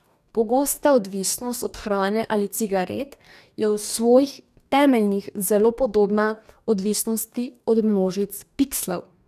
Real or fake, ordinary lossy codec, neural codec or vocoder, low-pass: fake; none; codec, 44.1 kHz, 2.6 kbps, DAC; 14.4 kHz